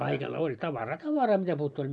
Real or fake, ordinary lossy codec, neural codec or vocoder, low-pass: real; none; none; none